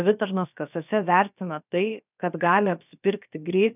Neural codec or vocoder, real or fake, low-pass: codec, 16 kHz, about 1 kbps, DyCAST, with the encoder's durations; fake; 3.6 kHz